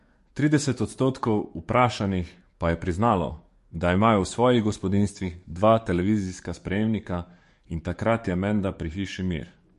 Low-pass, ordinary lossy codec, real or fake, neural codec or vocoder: 14.4 kHz; MP3, 48 kbps; fake; codec, 44.1 kHz, 7.8 kbps, DAC